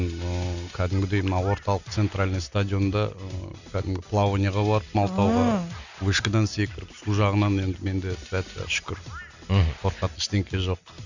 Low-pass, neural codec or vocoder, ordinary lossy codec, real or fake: 7.2 kHz; none; none; real